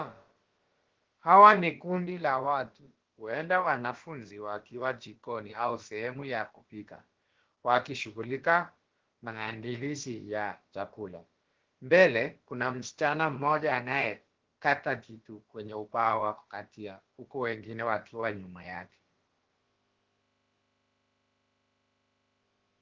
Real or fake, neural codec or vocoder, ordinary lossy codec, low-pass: fake; codec, 16 kHz, about 1 kbps, DyCAST, with the encoder's durations; Opus, 16 kbps; 7.2 kHz